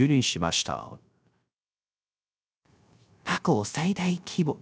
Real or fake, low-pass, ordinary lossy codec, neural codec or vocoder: fake; none; none; codec, 16 kHz, 0.3 kbps, FocalCodec